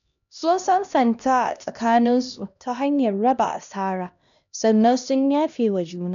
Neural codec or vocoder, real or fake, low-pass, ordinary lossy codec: codec, 16 kHz, 1 kbps, X-Codec, HuBERT features, trained on LibriSpeech; fake; 7.2 kHz; none